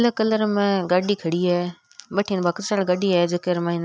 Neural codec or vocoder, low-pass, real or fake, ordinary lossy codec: none; none; real; none